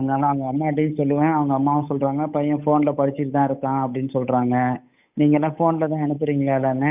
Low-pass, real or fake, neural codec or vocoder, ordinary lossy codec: 3.6 kHz; fake; codec, 16 kHz, 8 kbps, FunCodec, trained on Chinese and English, 25 frames a second; none